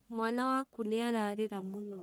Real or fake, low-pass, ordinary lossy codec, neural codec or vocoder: fake; none; none; codec, 44.1 kHz, 1.7 kbps, Pupu-Codec